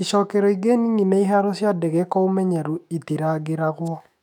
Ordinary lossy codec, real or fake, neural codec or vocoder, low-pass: none; fake; autoencoder, 48 kHz, 128 numbers a frame, DAC-VAE, trained on Japanese speech; 19.8 kHz